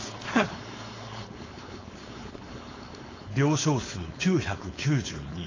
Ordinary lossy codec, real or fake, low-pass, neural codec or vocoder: AAC, 32 kbps; fake; 7.2 kHz; codec, 16 kHz, 4.8 kbps, FACodec